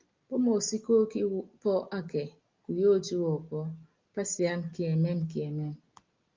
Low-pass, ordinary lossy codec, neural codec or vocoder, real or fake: 7.2 kHz; Opus, 32 kbps; none; real